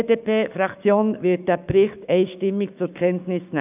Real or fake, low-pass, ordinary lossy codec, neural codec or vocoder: fake; 3.6 kHz; none; codec, 16 kHz, 6 kbps, DAC